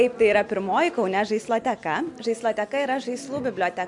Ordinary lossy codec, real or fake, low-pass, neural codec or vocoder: MP3, 64 kbps; real; 10.8 kHz; none